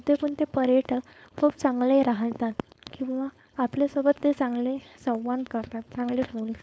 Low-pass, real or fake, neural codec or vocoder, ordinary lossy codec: none; fake; codec, 16 kHz, 4.8 kbps, FACodec; none